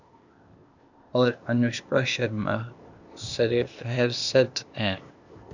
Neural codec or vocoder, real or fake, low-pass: codec, 16 kHz, 0.8 kbps, ZipCodec; fake; 7.2 kHz